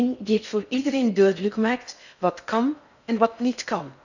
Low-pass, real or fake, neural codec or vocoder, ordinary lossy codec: 7.2 kHz; fake; codec, 16 kHz in and 24 kHz out, 0.6 kbps, FocalCodec, streaming, 4096 codes; none